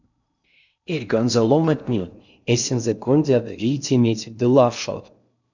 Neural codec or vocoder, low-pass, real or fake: codec, 16 kHz in and 24 kHz out, 0.6 kbps, FocalCodec, streaming, 4096 codes; 7.2 kHz; fake